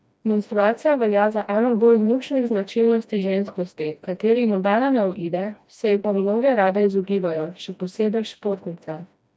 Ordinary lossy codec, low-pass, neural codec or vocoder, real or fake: none; none; codec, 16 kHz, 1 kbps, FreqCodec, smaller model; fake